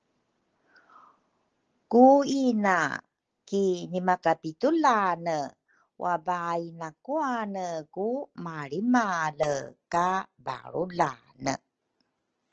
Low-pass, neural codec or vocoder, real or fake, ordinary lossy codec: 7.2 kHz; none; real; Opus, 16 kbps